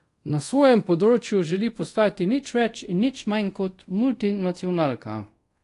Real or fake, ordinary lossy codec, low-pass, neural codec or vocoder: fake; AAC, 48 kbps; 10.8 kHz; codec, 24 kHz, 0.5 kbps, DualCodec